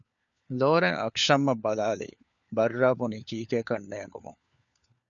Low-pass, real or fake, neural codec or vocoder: 7.2 kHz; fake; codec, 16 kHz, 4 kbps, FunCodec, trained on LibriTTS, 50 frames a second